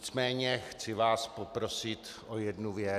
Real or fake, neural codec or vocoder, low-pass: real; none; 14.4 kHz